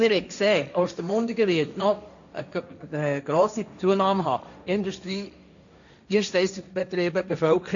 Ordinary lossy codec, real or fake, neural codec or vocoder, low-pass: none; fake; codec, 16 kHz, 1.1 kbps, Voila-Tokenizer; 7.2 kHz